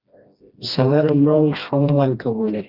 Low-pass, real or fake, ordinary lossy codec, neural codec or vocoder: 5.4 kHz; fake; Opus, 24 kbps; codec, 16 kHz, 1 kbps, FreqCodec, smaller model